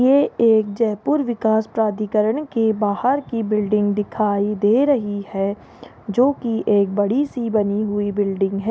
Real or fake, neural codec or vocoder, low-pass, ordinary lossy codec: real; none; none; none